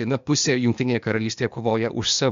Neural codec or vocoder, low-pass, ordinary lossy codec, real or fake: codec, 16 kHz, 0.8 kbps, ZipCodec; 7.2 kHz; MP3, 64 kbps; fake